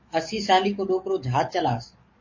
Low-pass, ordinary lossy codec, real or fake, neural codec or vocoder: 7.2 kHz; MP3, 32 kbps; fake; codec, 44.1 kHz, 7.8 kbps, DAC